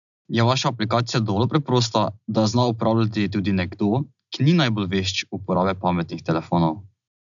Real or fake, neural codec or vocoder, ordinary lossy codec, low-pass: real; none; none; 7.2 kHz